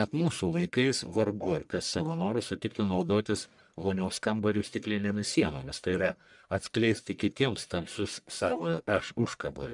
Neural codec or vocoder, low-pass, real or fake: codec, 44.1 kHz, 1.7 kbps, Pupu-Codec; 10.8 kHz; fake